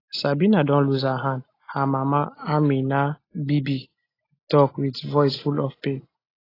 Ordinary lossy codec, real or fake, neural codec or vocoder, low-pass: AAC, 24 kbps; real; none; 5.4 kHz